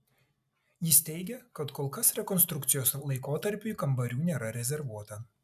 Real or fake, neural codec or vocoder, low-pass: real; none; 14.4 kHz